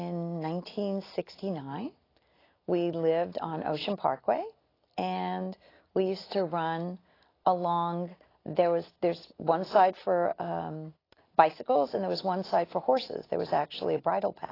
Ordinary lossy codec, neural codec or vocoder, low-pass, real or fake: AAC, 24 kbps; none; 5.4 kHz; real